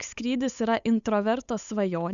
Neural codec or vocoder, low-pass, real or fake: codec, 16 kHz, 8 kbps, FunCodec, trained on Chinese and English, 25 frames a second; 7.2 kHz; fake